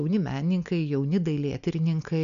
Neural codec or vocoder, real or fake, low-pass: none; real; 7.2 kHz